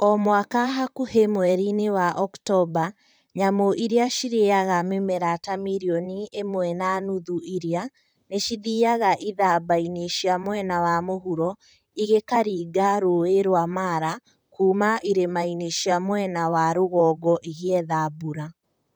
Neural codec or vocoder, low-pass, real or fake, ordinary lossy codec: vocoder, 44.1 kHz, 128 mel bands, Pupu-Vocoder; none; fake; none